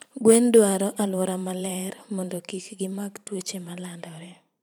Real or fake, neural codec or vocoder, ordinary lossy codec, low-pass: fake; vocoder, 44.1 kHz, 128 mel bands every 512 samples, BigVGAN v2; none; none